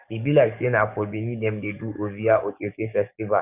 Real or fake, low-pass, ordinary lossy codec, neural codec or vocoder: fake; 3.6 kHz; none; codec, 44.1 kHz, 7.8 kbps, DAC